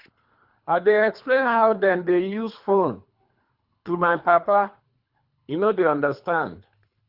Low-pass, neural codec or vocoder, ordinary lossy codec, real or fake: 5.4 kHz; codec, 24 kHz, 3 kbps, HILCodec; Opus, 64 kbps; fake